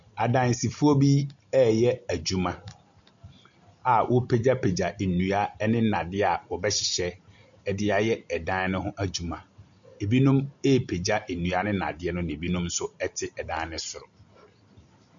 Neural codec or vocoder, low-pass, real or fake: none; 7.2 kHz; real